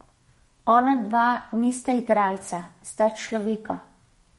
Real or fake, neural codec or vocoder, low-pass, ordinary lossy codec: fake; codec, 32 kHz, 1.9 kbps, SNAC; 14.4 kHz; MP3, 48 kbps